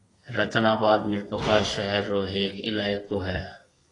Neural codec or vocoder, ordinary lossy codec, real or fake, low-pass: codec, 32 kHz, 1.9 kbps, SNAC; AAC, 32 kbps; fake; 10.8 kHz